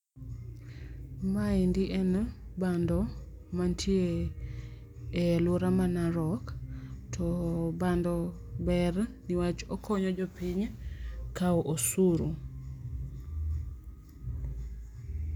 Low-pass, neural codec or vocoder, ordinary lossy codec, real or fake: 19.8 kHz; none; none; real